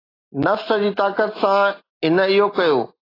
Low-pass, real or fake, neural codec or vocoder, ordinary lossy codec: 5.4 kHz; real; none; AAC, 24 kbps